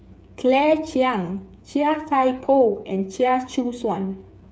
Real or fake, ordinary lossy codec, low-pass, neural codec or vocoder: fake; none; none; codec, 16 kHz, 8 kbps, FreqCodec, smaller model